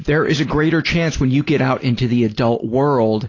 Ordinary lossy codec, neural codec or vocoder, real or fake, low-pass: AAC, 32 kbps; none; real; 7.2 kHz